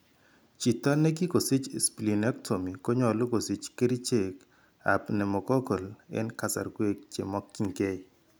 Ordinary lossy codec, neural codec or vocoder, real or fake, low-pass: none; none; real; none